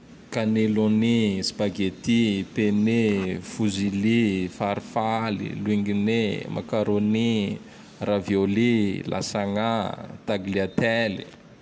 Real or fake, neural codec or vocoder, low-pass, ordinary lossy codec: real; none; none; none